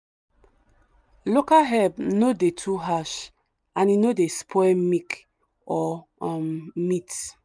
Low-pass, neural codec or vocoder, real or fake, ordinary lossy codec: none; none; real; none